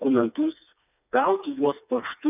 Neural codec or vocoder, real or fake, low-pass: codec, 16 kHz, 2 kbps, FreqCodec, smaller model; fake; 3.6 kHz